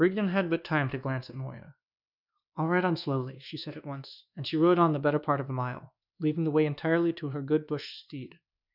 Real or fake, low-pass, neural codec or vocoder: fake; 5.4 kHz; codec, 24 kHz, 1.2 kbps, DualCodec